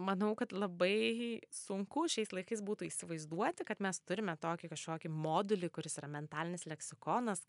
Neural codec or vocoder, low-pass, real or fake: none; 10.8 kHz; real